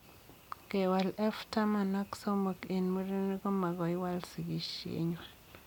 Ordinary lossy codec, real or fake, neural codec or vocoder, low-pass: none; real; none; none